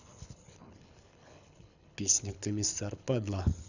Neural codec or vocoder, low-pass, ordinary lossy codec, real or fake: codec, 24 kHz, 6 kbps, HILCodec; 7.2 kHz; none; fake